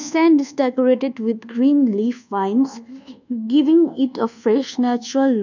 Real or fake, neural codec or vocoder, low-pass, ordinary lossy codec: fake; codec, 24 kHz, 1.2 kbps, DualCodec; 7.2 kHz; none